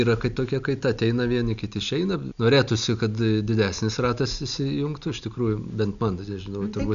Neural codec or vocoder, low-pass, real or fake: none; 7.2 kHz; real